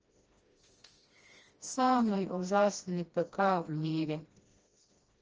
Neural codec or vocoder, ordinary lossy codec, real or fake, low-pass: codec, 16 kHz, 1 kbps, FreqCodec, smaller model; Opus, 24 kbps; fake; 7.2 kHz